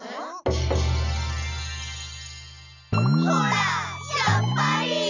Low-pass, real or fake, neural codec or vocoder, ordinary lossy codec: 7.2 kHz; real; none; none